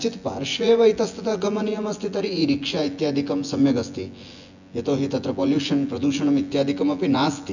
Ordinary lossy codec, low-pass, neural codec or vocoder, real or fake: none; 7.2 kHz; vocoder, 24 kHz, 100 mel bands, Vocos; fake